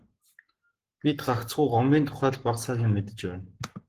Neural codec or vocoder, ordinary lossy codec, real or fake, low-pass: codec, 44.1 kHz, 7.8 kbps, Pupu-Codec; Opus, 16 kbps; fake; 14.4 kHz